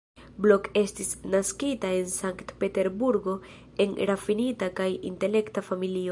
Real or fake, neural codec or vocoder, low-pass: real; none; 10.8 kHz